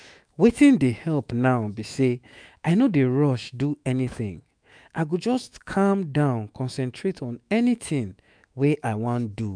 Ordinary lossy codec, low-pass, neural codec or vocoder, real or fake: none; 9.9 kHz; autoencoder, 48 kHz, 128 numbers a frame, DAC-VAE, trained on Japanese speech; fake